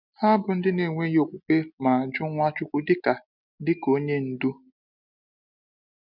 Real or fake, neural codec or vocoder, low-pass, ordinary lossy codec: real; none; 5.4 kHz; none